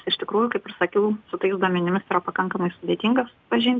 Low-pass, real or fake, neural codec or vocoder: 7.2 kHz; real; none